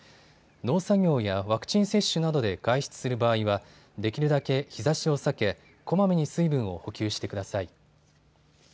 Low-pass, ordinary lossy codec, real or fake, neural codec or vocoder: none; none; real; none